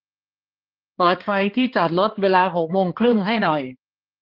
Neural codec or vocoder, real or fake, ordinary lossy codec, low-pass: codec, 16 kHz, 2 kbps, X-Codec, HuBERT features, trained on balanced general audio; fake; Opus, 16 kbps; 5.4 kHz